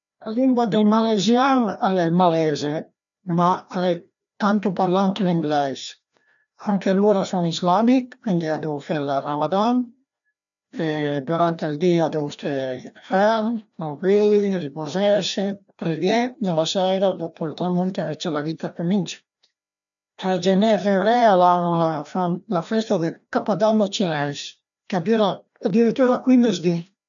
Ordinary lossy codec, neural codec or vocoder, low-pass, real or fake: none; codec, 16 kHz, 1 kbps, FreqCodec, larger model; 7.2 kHz; fake